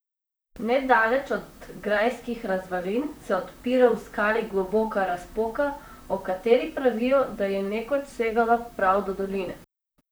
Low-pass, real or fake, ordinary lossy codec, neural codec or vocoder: none; fake; none; vocoder, 44.1 kHz, 128 mel bands, Pupu-Vocoder